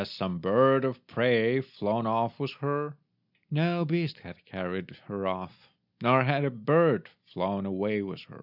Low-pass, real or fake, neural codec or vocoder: 5.4 kHz; real; none